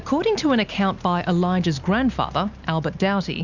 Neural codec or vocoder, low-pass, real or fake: none; 7.2 kHz; real